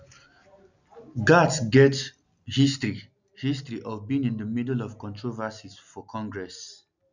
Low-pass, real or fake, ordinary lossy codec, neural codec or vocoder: 7.2 kHz; real; none; none